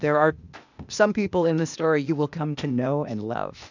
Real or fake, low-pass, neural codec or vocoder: fake; 7.2 kHz; codec, 16 kHz, 0.8 kbps, ZipCodec